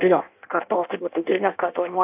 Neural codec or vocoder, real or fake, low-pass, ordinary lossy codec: codec, 16 kHz in and 24 kHz out, 0.6 kbps, FireRedTTS-2 codec; fake; 3.6 kHz; AAC, 32 kbps